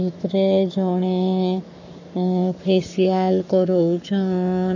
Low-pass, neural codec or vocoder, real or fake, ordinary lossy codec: 7.2 kHz; codec, 44.1 kHz, 7.8 kbps, Pupu-Codec; fake; none